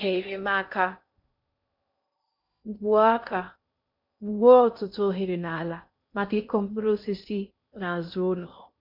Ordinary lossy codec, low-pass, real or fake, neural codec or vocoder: MP3, 48 kbps; 5.4 kHz; fake; codec, 16 kHz in and 24 kHz out, 0.6 kbps, FocalCodec, streaming, 2048 codes